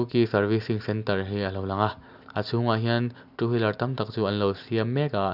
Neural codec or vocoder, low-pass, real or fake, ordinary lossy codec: none; 5.4 kHz; real; none